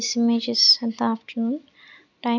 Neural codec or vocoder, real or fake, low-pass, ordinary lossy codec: none; real; 7.2 kHz; none